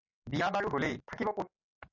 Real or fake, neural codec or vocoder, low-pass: real; none; 7.2 kHz